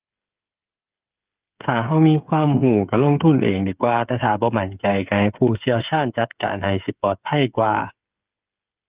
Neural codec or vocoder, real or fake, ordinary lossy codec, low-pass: codec, 16 kHz, 8 kbps, FreqCodec, smaller model; fake; Opus, 32 kbps; 3.6 kHz